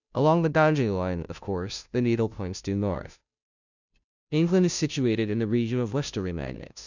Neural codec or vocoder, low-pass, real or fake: codec, 16 kHz, 0.5 kbps, FunCodec, trained on Chinese and English, 25 frames a second; 7.2 kHz; fake